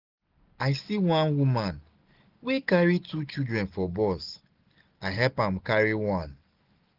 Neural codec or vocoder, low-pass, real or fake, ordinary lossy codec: none; 5.4 kHz; real; Opus, 32 kbps